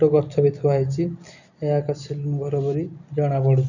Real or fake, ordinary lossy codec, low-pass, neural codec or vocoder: real; none; 7.2 kHz; none